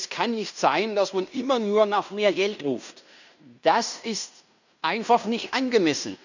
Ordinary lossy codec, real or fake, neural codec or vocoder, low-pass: none; fake; codec, 16 kHz in and 24 kHz out, 0.9 kbps, LongCat-Audio-Codec, fine tuned four codebook decoder; 7.2 kHz